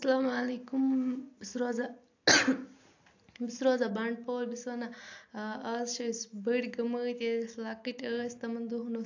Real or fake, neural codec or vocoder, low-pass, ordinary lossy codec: real; none; 7.2 kHz; AAC, 48 kbps